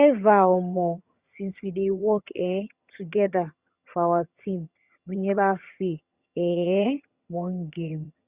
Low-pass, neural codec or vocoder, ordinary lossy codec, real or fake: 3.6 kHz; vocoder, 22.05 kHz, 80 mel bands, HiFi-GAN; Opus, 64 kbps; fake